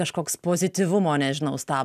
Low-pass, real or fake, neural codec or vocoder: 14.4 kHz; real; none